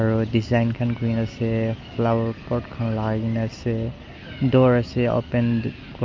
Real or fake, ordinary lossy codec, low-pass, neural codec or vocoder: real; Opus, 32 kbps; 7.2 kHz; none